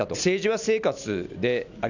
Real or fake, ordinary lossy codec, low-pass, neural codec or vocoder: real; none; 7.2 kHz; none